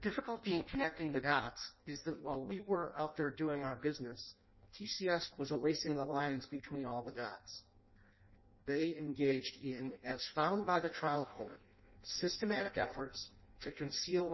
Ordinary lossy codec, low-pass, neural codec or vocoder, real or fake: MP3, 24 kbps; 7.2 kHz; codec, 16 kHz in and 24 kHz out, 0.6 kbps, FireRedTTS-2 codec; fake